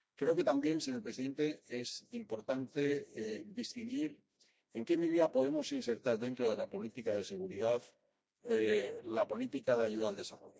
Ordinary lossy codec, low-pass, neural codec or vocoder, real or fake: none; none; codec, 16 kHz, 1 kbps, FreqCodec, smaller model; fake